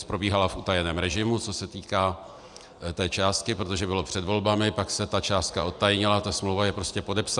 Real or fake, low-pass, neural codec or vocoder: real; 10.8 kHz; none